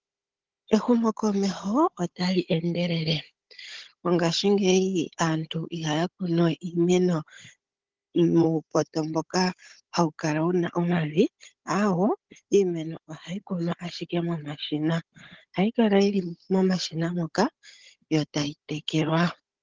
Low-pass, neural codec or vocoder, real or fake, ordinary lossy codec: 7.2 kHz; codec, 16 kHz, 16 kbps, FunCodec, trained on Chinese and English, 50 frames a second; fake; Opus, 16 kbps